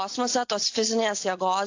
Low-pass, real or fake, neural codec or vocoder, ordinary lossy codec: 7.2 kHz; real; none; AAC, 48 kbps